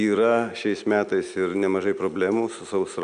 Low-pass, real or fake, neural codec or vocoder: 9.9 kHz; real; none